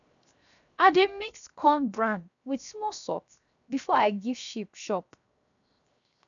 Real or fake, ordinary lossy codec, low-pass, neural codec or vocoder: fake; none; 7.2 kHz; codec, 16 kHz, 0.7 kbps, FocalCodec